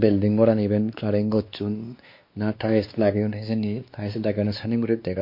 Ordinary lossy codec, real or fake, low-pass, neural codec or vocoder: MP3, 32 kbps; fake; 5.4 kHz; codec, 16 kHz, 2 kbps, X-Codec, WavLM features, trained on Multilingual LibriSpeech